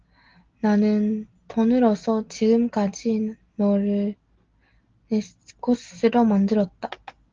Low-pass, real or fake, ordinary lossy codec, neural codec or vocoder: 7.2 kHz; real; Opus, 16 kbps; none